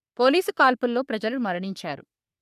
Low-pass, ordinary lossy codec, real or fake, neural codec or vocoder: 14.4 kHz; none; fake; codec, 44.1 kHz, 3.4 kbps, Pupu-Codec